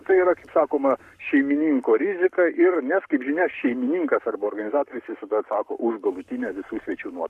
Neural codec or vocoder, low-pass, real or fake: codec, 44.1 kHz, 7.8 kbps, Pupu-Codec; 14.4 kHz; fake